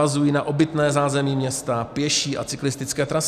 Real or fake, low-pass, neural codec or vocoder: real; 14.4 kHz; none